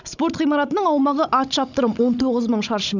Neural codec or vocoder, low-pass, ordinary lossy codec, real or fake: codec, 16 kHz, 16 kbps, FunCodec, trained on Chinese and English, 50 frames a second; 7.2 kHz; none; fake